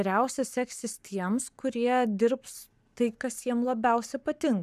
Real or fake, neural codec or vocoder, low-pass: fake; codec, 44.1 kHz, 7.8 kbps, Pupu-Codec; 14.4 kHz